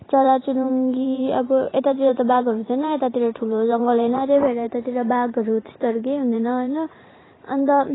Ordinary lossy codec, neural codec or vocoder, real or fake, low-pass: AAC, 16 kbps; vocoder, 44.1 kHz, 128 mel bands every 512 samples, BigVGAN v2; fake; 7.2 kHz